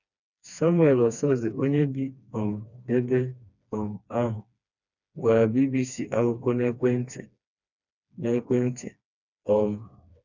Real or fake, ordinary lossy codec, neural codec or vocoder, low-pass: fake; none; codec, 16 kHz, 2 kbps, FreqCodec, smaller model; 7.2 kHz